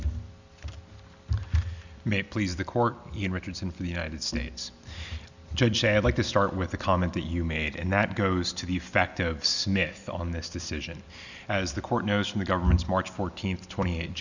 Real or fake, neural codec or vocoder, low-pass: real; none; 7.2 kHz